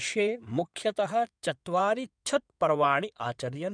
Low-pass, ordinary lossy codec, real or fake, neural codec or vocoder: 9.9 kHz; none; fake; codec, 16 kHz in and 24 kHz out, 2.2 kbps, FireRedTTS-2 codec